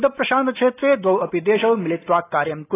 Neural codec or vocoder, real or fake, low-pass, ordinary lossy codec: none; real; 3.6 kHz; AAC, 16 kbps